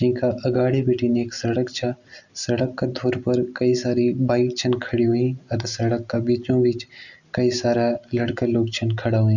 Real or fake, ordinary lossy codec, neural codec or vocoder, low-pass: real; Opus, 64 kbps; none; 7.2 kHz